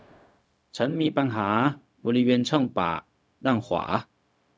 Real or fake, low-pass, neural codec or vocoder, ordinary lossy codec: fake; none; codec, 16 kHz, 0.4 kbps, LongCat-Audio-Codec; none